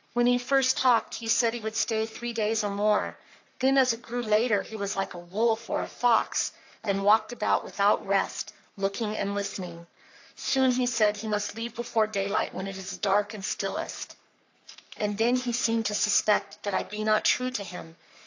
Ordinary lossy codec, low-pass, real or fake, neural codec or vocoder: AAC, 48 kbps; 7.2 kHz; fake; codec, 44.1 kHz, 3.4 kbps, Pupu-Codec